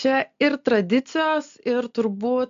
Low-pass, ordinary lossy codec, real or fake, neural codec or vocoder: 7.2 kHz; AAC, 64 kbps; real; none